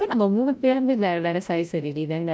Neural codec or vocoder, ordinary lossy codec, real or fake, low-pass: codec, 16 kHz, 0.5 kbps, FreqCodec, larger model; none; fake; none